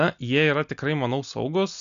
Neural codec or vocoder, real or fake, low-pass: none; real; 7.2 kHz